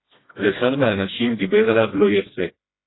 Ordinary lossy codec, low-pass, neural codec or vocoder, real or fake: AAC, 16 kbps; 7.2 kHz; codec, 16 kHz, 1 kbps, FreqCodec, smaller model; fake